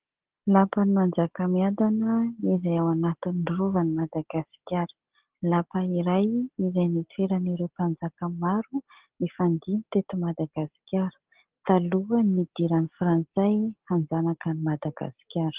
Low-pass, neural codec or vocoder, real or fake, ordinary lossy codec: 3.6 kHz; none; real; Opus, 16 kbps